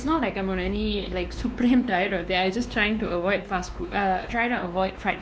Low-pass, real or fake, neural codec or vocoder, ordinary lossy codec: none; fake; codec, 16 kHz, 2 kbps, X-Codec, WavLM features, trained on Multilingual LibriSpeech; none